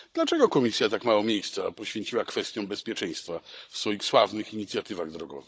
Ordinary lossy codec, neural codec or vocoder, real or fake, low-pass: none; codec, 16 kHz, 16 kbps, FunCodec, trained on Chinese and English, 50 frames a second; fake; none